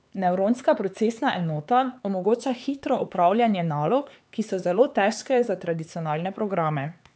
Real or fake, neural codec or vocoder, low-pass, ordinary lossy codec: fake; codec, 16 kHz, 4 kbps, X-Codec, HuBERT features, trained on LibriSpeech; none; none